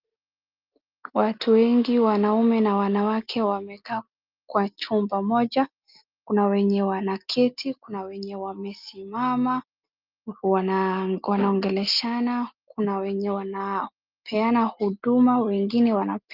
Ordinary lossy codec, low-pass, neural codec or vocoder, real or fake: Opus, 24 kbps; 5.4 kHz; none; real